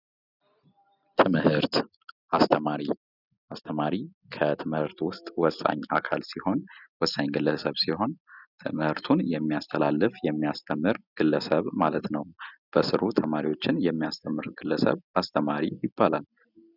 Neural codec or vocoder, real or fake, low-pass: none; real; 5.4 kHz